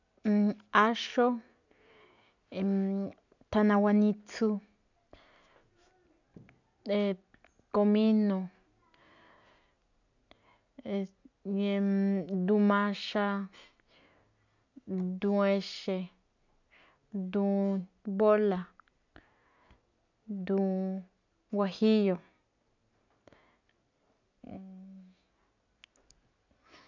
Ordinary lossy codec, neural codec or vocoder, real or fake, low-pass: none; none; real; 7.2 kHz